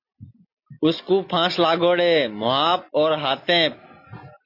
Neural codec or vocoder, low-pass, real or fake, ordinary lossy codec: none; 5.4 kHz; real; MP3, 32 kbps